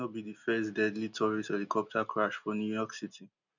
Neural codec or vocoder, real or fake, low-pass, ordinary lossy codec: none; real; 7.2 kHz; none